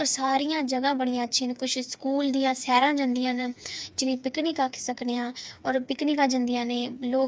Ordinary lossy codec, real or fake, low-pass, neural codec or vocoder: none; fake; none; codec, 16 kHz, 4 kbps, FreqCodec, smaller model